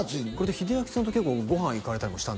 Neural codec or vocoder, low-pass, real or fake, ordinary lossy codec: none; none; real; none